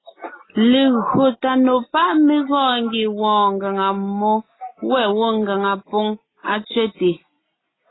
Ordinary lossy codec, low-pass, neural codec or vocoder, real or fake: AAC, 16 kbps; 7.2 kHz; none; real